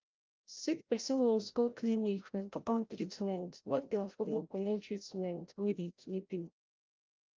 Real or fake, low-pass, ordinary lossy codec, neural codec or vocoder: fake; 7.2 kHz; Opus, 24 kbps; codec, 16 kHz, 0.5 kbps, FreqCodec, larger model